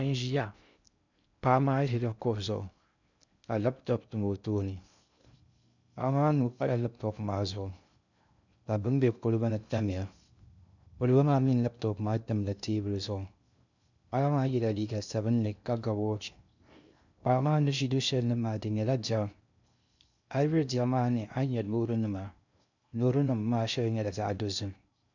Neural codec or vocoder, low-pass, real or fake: codec, 16 kHz in and 24 kHz out, 0.6 kbps, FocalCodec, streaming, 2048 codes; 7.2 kHz; fake